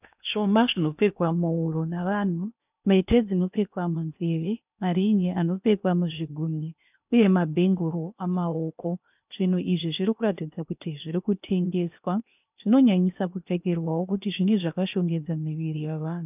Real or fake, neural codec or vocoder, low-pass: fake; codec, 16 kHz in and 24 kHz out, 0.8 kbps, FocalCodec, streaming, 65536 codes; 3.6 kHz